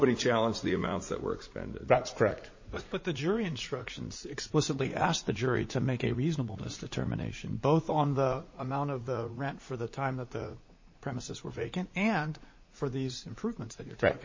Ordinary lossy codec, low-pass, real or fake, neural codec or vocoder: MP3, 32 kbps; 7.2 kHz; fake; vocoder, 44.1 kHz, 80 mel bands, Vocos